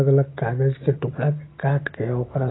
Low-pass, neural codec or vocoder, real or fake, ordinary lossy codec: 7.2 kHz; none; real; AAC, 16 kbps